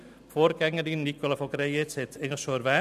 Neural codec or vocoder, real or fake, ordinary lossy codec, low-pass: none; real; none; 14.4 kHz